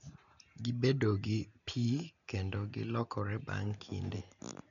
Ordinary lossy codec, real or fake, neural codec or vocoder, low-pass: none; real; none; 7.2 kHz